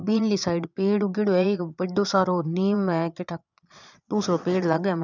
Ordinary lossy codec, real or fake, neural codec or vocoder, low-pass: none; fake; vocoder, 22.05 kHz, 80 mel bands, Vocos; 7.2 kHz